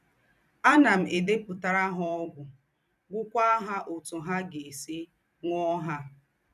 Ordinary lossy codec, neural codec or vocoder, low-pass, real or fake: none; vocoder, 44.1 kHz, 128 mel bands every 256 samples, BigVGAN v2; 14.4 kHz; fake